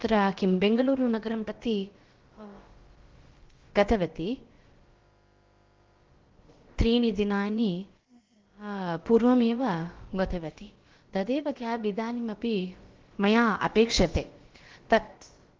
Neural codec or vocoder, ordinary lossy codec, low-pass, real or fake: codec, 16 kHz, about 1 kbps, DyCAST, with the encoder's durations; Opus, 16 kbps; 7.2 kHz; fake